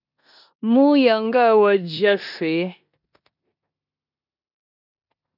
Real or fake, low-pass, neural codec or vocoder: fake; 5.4 kHz; codec, 16 kHz in and 24 kHz out, 0.9 kbps, LongCat-Audio-Codec, four codebook decoder